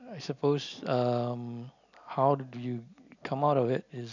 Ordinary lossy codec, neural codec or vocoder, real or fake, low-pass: none; none; real; 7.2 kHz